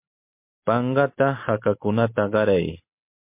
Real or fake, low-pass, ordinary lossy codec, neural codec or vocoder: real; 3.6 kHz; MP3, 32 kbps; none